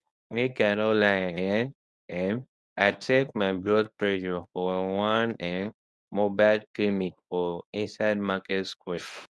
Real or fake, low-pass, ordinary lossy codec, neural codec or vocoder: fake; none; none; codec, 24 kHz, 0.9 kbps, WavTokenizer, medium speech release version 1